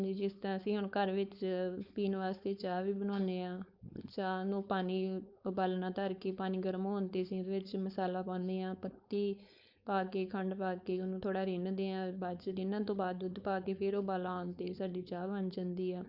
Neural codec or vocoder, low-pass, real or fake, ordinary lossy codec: codec, 16 kHz, 4.8 kbps, FACodec; 5.4 kHz; fake; Opus, 64 kbps